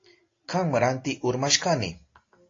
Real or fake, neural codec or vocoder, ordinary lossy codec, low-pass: real; none; AAC, 32 kbps; 7.2 kHz